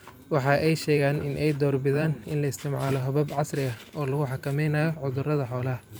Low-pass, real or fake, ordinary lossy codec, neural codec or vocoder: none; fake; none; vocoder, 44.1 kHz, 128 mel bands every 512 samples, BigVGAN v2